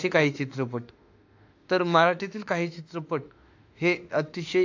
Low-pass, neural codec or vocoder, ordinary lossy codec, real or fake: 7.2 kHz; autoencoder, 48 kHz, 32 numbers a frame, DAC-VAE, trained on Japanese speech; AAC, 48 kbps; fake